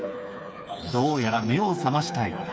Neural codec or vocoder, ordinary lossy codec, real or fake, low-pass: codec, 16 kHz, 4 kbps, FreqCodec, smaller model; none; fake; none